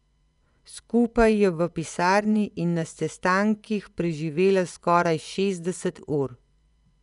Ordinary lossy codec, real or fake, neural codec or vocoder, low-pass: none; fake; vocoder, 24 kHz, 100 mel bands, Vocos; 10.8 kHz